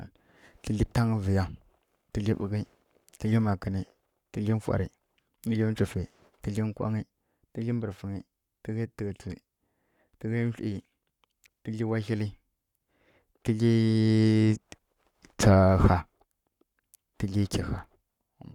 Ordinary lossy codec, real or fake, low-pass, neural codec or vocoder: none; fake; 19.8 kHz; codec, 44.1 kHz, 7.8 kbps, Pupu-Codec